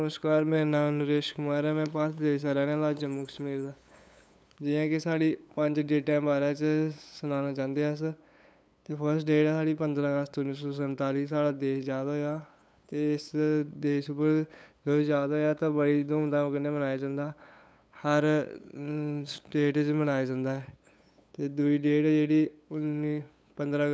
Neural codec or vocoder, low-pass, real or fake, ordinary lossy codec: codec, 16 kHz, 8 kbps, FunCodec, trained on LibriTTS, 25 frames a second; none; fake; none